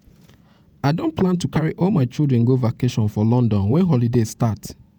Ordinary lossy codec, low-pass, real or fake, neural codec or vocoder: none; none; fake; vocoder, 48 kHz, 128 mel bands, Vocos